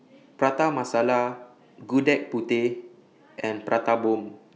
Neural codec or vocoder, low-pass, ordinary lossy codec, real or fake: none; none; none; real